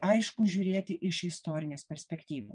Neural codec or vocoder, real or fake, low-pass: vocoder, 22.05 kHz, 80 mel bands, WaveNeXt; fake; 9.9 kHz